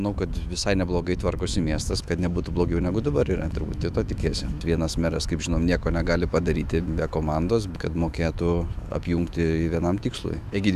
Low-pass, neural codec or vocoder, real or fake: 14.4 kHz; autoencoder, 48 kHz, 128 numbers a frame, DAC-VAE, trained on Japanese speech; fake